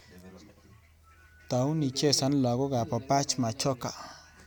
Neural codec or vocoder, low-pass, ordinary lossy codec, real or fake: none; none; none; real